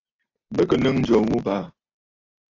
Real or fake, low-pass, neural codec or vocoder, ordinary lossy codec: real; 7.2 kHz; none; AAC, 32 kbps